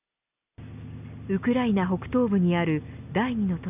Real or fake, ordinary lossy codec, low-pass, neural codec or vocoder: real; none; 3.6 kHz; none